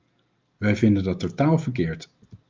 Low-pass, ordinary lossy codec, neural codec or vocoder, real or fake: 7.2 kHz; Opus, 24 kbps; none; real